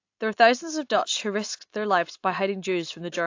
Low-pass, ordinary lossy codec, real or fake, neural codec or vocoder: 7.2 kHz; AAC, 48 kbps; real; none